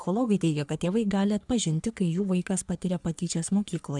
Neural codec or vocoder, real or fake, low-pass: codec, 44.1 kHz, 3.4 kbps, Pupu-Codec; fake; 10.8 kHz